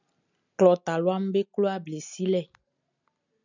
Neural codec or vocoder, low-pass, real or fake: none; 7.2 kHz; real